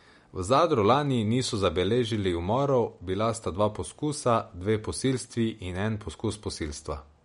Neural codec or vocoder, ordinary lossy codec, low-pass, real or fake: none; MP3, 48 kbps; 10.8 kHz; real